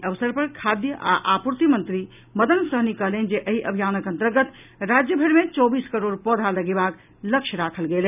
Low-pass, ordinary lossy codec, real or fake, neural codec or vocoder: 3.6 kHz; none; real; none